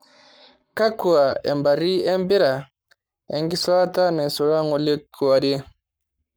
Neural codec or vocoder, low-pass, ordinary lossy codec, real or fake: codec, 44.1 kHz, 7.8 kbps, Pupu-Codec; none; none; fake